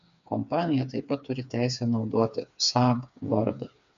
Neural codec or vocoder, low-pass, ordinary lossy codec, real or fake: codec, 16 kHz, 4 kbps, FreqCodec, smaller model; 7.2 kHz; MP3, 64 kbps; fake